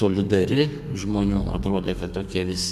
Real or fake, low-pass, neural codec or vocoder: fake; 14.4 kHz; codec, 32 kHz, 1.9 kbps, SNAC